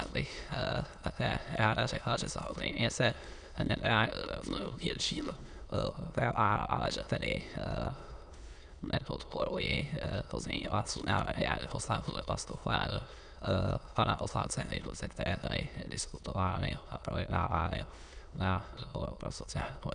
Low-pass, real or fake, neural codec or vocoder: 9.9 kHz; fake; autoencoder, 22.05 kHz, a latent of 192 numbers a frame, VITS, trained on many speakers